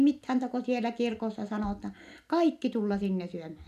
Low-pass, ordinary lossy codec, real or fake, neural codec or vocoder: 14.4 kHz; none; real; none